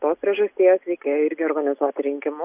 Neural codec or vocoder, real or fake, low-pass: none; real; 3.6 kHz